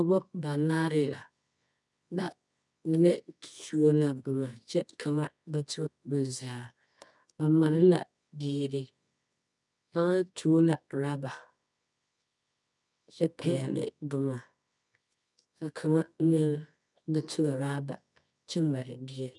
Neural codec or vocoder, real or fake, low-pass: codec, 24 kHz, 0.9 kbps, WavTokenizer, medium music audio release; fake; 10.8 kHz